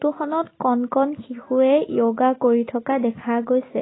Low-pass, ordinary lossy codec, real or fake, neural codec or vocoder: 7.2 kHz; AAC, 16 kbps; real; none